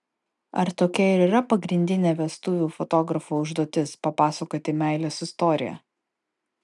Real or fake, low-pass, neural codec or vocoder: real; 10.8 kHz; none